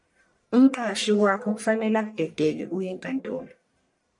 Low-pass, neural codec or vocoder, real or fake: 10.8 kHz; codec, 44.1 kHz, 1.7 kbps, Pupu-Codec; fake